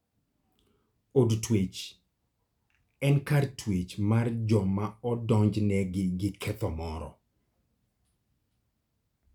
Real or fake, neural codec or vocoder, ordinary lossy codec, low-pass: real; none; none; 19.8 kHz